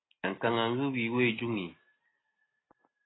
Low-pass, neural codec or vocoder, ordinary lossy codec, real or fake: 7.2 kHz; none; AAC, 16 kbps; real